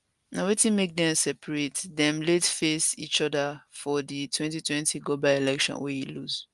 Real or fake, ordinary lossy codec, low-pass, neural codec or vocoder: real; Opus, 32 kbps; 10.8 kHz; none